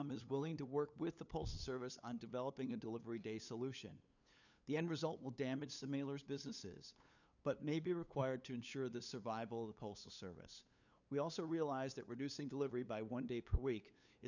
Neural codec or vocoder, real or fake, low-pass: codec, 16 kHz, 8 kbps, FunCodec, trained on LibriTTS, 25 frames a second; fake; 7.2 kHz